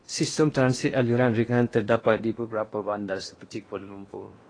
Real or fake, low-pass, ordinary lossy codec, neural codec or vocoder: fake; 9.9 kHz; AAC, 32 kbps; codec, 16 kHz in and 24 kHz out, 0.8 kbps, FocalCodec, streaming, 65536 codes